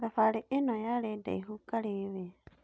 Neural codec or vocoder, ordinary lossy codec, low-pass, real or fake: none; none; none; real